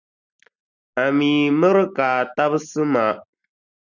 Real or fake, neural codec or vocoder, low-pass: real; none; 7.2 kHz